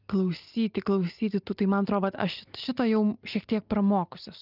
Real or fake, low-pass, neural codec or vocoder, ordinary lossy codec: real; 5.4 kHz; none; Opus, 24 kbps